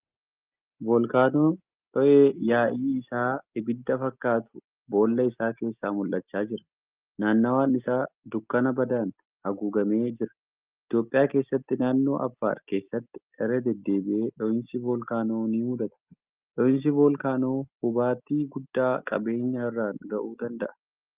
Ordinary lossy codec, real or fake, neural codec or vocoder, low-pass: Opus, 32 kbps; real; none; 3.6 kHz